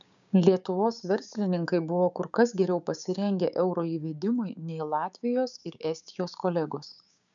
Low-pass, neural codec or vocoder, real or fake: 7.2 kHz; codec, 16 kHz, 6 kbps, DAC; fake